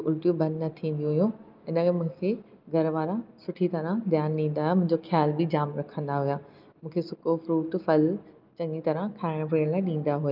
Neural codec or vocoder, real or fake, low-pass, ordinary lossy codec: none; real; 5.4 kHz; Opus, 24 kbps